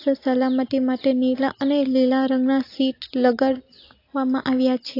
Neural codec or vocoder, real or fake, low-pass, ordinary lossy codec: none; real; 5.4 kHz; AAC, 32 kbps